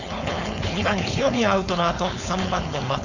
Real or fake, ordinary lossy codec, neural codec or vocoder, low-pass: fake; none; codec, 16 kHz, 4.8 kbps, FACodec; 7.2 kHz